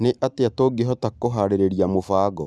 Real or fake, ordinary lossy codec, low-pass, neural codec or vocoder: real; none; none; none